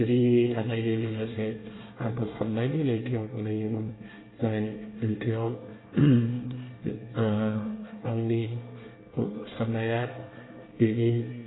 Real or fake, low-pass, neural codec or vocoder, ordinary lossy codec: fake; 7.2 kHz; codec, 24 kHz, 1 kbps, SNAC; AAC, 16 kbps